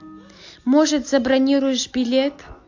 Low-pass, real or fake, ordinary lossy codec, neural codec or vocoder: 7.2 kHz; real; AAC, 48 kbps; none